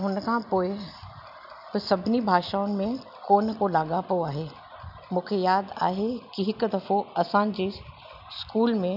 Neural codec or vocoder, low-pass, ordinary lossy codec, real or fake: none; 5.4 kHz; none; real